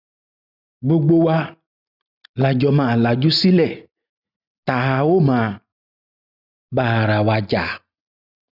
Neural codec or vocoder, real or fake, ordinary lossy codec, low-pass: none; real; none; 5.4 kHz